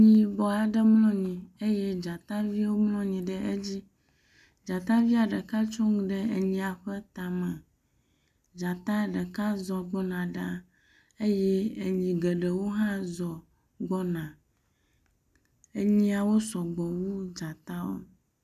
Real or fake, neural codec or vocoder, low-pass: real; none; 14.4 kHz